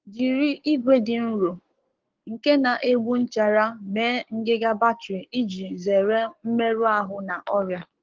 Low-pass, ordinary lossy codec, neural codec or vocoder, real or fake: 7.2 kHz; Opus, 16 kbps; codec, 44.1 kHz, 7.8 kbps, Pupu-Codec; fake